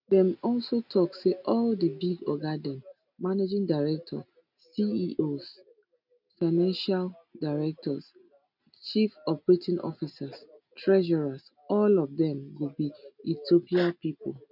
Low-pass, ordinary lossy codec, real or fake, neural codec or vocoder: 5.4 kHz; none; real; none